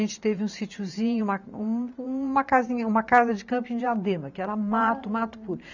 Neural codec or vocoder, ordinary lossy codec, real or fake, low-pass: vocoder, 44.1 kHz, 128 mel bands every 512 samples, BigVGAN v2; none; fake; 7.2 kHz